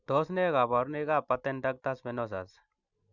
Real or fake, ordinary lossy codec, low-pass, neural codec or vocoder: real; none; 7.2 kHz; none